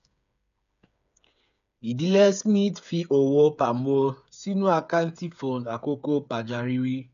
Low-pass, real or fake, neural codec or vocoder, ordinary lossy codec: 7.2 kHz; fake; codec, 16 kHz, 8 kbps, FreqCodec, smaller model; none